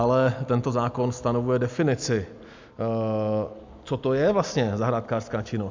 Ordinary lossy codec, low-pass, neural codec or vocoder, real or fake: MP3, 64 kbps; 7.2 kHz; none; real